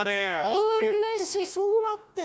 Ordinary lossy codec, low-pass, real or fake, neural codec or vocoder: none; none; fake; codec, 16 kHz, 1 kbps, FunCodec, trained on Chinese and English, 50 frames a second